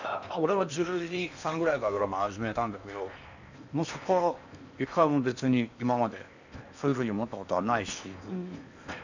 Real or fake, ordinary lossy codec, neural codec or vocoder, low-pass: fake; none; codec, 16 kHz in and 24 kHz out, 0.8 kbps, FocalCodec, streaming, 65536 codes; 7.2 kHz